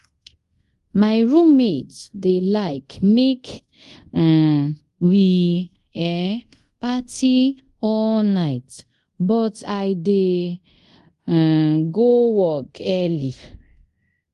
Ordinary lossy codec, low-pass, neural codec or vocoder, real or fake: Opus, 24 kbps; 10.8 kHz; codec, 24 kHz, 0.5 kbps, DualCodec; fake